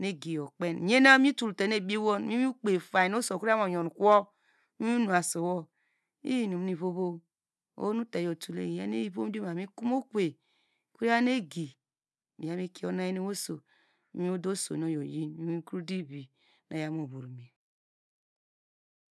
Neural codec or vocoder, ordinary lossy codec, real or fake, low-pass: none; none; real; none